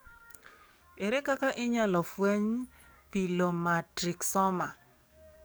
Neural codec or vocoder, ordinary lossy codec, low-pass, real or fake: codec, 44.1 kHz, 7.8 kbps, DAC; none; none; fake